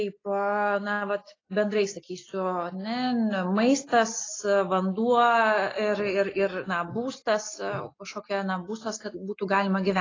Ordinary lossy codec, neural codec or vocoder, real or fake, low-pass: AAC, 32 kbps; none; real; 7.2 kHz